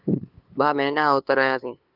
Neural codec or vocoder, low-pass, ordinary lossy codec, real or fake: codec, 16 kHz, 2 kbps, FunCodec, trained on LibriTTS, 25 frames a second; 5.4 kHz; Opus, 24 kbps; fake